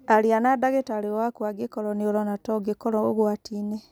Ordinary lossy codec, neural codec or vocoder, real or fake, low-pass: none; none; real; none